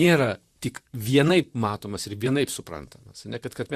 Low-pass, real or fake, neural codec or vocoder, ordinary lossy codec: 14.4 kHz; fake; vocoder, 44.1 kHz, 128 mel bands, Pupu-Vocoder; Opus, 64 kbps